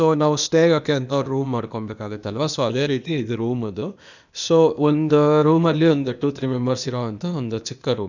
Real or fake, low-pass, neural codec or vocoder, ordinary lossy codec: fake; 7.2 kHz; codec, 16 kHz, 0.8 kbps, ZipCodec; none